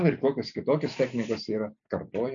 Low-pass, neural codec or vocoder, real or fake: 7.2 kHz; none; real